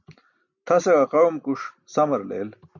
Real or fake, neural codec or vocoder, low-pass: real; none; 7.2 kHz